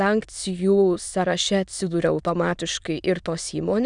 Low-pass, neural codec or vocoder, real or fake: 9.9 kHz; autoencoder, 22.05 kHz, a latent of 192 numbers a frame, VITS, trained on many speakers; fake